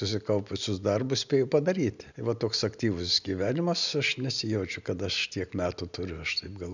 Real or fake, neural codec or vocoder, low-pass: real; none; 7.2 kHz